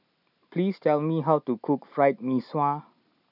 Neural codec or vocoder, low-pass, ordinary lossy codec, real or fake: none; 5.4 kHz; none; real